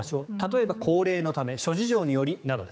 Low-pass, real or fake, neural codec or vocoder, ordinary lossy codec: none; fake; codec, 16 kHz, 4 kbps, X-Codec, HuBERT features, trained on general audio; none